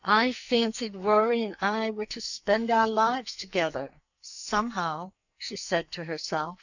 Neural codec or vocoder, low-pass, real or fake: codec, 44.1 kHz, 2.6 kbps, SNAC; 7.2 kHz; fake